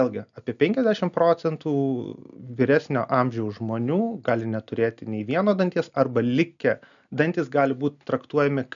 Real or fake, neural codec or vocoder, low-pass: real; none; 7.2 kHz